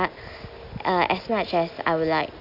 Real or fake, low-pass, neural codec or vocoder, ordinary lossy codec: real; 5.4 kHz; none; none